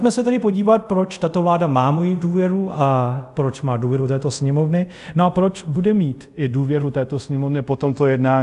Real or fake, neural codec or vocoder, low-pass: fake; codec, 24 kHz, 0.5 kbps, DualCodec; 10.8 kHz